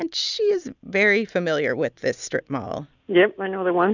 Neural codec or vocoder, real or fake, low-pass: none; real; 7.2 kHz